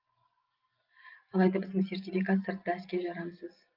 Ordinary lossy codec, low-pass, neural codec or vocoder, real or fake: Opus, 24 kbps; 5.4 kHz; none; real